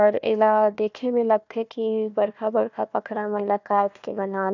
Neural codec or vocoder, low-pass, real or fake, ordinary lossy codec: codec, 16 kHz, 1 kbps, FunCodec, trained on Chinese and English, 50 frames a second; 7.2 kHz; fake; none